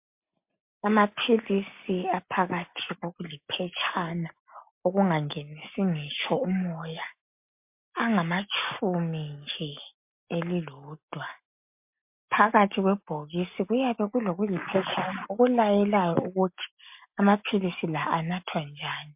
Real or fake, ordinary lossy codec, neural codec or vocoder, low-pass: real; MP3, 32 kbps; none; 3.6 kHz